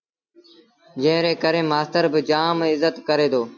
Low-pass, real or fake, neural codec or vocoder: 7.2 kHz; real; none